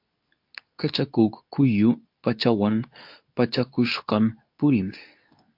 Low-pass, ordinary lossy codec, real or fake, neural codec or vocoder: 5.4 kHz; MP3, 48 kbps; fake; codec, 24 kHz, 0.9 kbps, WavTokenizer, medium speech release version 2